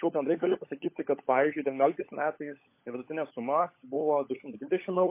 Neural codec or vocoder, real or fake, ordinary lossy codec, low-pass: codec, 16 kHz, 16 kbps, FunCodec, trained on LibriTTS, 50 frames a second; fake; MP3, 24 kbps; 3.6 kHz